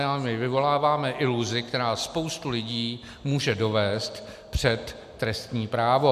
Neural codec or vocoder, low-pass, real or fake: none; 14.4 kHz; real